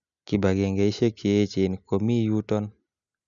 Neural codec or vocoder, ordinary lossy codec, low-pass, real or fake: none; none; 7.2 kHz; real